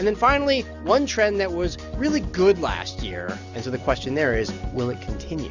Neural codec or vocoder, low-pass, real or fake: none; 7.2 kHz; real